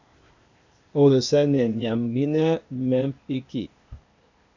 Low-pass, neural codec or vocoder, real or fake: 7.2 kHz; codec, 16 kHz, 0.8 kbps, ZipCodec; fake